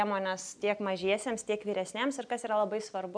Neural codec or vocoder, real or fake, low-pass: none; real; 9.9 kHz